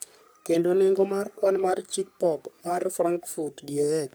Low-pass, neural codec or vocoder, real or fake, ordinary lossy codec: none; codec, 44.1 kHz, 3.4 kbps, Pupu-Codec; fake; none